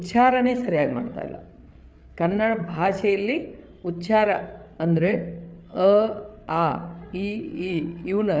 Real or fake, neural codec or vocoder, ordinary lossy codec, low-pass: fake; codec, 16 kHz, 8 kbps, FreqCodec, larger model; none; none